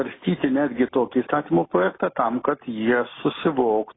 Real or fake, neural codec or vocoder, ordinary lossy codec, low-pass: real; none; AAC, 16 kbps; 7.2 kHz